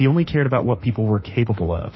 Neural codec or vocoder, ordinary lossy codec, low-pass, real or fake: autoencoder, 48 kHz, 32 numbers a frame, DAC-VAE, trained on Japanese speech; MP3, 24 kbps; 7.2 kHz; fake